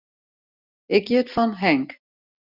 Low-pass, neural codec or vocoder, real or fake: 5.4 kHz; none; real